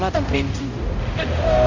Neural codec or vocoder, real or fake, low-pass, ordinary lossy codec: codec, 16 kHz, 0.5 kbps, X-Codec, HuBERT features, trained on balanced general audio; fake; 7.2 kHz; MP3, 48 kbps